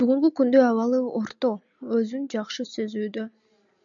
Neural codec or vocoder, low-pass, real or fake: none; 7.2 kHz; real